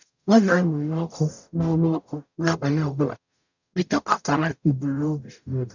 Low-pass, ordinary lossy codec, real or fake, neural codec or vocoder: 7.2 kHz; none; fake; codec, 44.1 kHz, 0.9 kbps, DAC